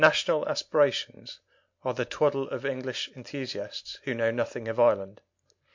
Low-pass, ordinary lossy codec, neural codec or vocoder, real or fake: 7.2 kHz; MP3, 48 kbps; none; real